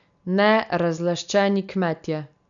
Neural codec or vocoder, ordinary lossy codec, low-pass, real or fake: none; none; 7.2 kHz; real